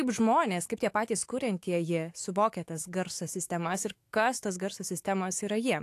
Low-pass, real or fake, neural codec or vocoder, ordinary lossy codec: 14.4 kHz; fake; autoencoder, 48 kHz, 128 numbers a frame, DAC-VAE, trained on Japanese speech; AAC, 64 kbps